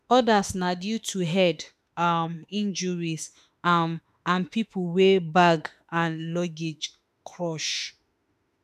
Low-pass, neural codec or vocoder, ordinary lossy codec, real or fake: 14.4 kHz; autoencoder, 48 kHz, 32 numbers a frame, DAC-VAE, trained on Japanese speech; none; fake